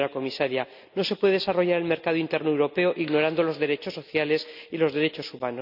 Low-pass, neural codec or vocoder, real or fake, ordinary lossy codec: 5.4 kHz; none; real; none